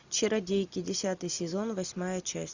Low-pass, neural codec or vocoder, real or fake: 7.2 kHz; none; real